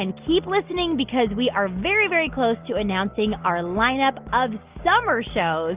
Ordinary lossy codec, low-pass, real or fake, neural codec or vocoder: Opus, 24 kbps; 3.6 kHz; real; none